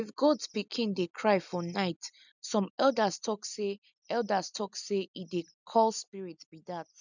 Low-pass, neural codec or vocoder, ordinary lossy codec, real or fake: 7.2 kHz; none; none; real